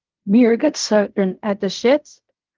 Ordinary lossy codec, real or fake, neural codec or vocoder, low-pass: Opus, 32 kbps; fake; codec, 16 kHz in and 24 kHz out, 0.4 kbps, LongCat-Audio-Codec, fine tuned four codebook decoder; 7.2 kHz